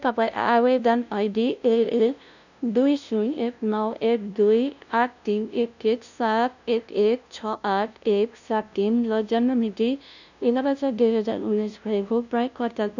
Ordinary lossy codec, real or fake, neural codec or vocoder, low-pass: none; fake; codec, 16 kHz, 0.5 kbps, FunCodec, trained on LibriTTS, 25 frames a second; 7.2 kHz